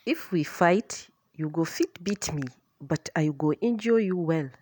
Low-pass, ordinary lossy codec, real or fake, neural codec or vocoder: none; none; real; none